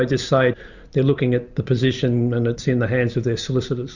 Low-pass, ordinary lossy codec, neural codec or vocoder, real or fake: 7.2 kHz; Opus, 64 kbps; none; real